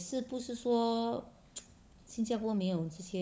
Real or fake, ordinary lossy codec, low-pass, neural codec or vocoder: fake; none; none; codec, 16 kHz, 16 kbps, FunCodec, trained on LibriTTS, 50 frames a second